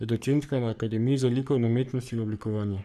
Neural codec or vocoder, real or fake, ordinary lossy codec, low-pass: codec, 44.1 kHz, 3.4 kbps, Pupu-Codec; fake; none; 14.4 kHz